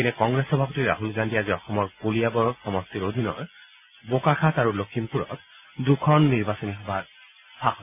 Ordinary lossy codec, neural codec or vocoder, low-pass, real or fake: AAC, 32 kbps; none; 3.6 kHz; real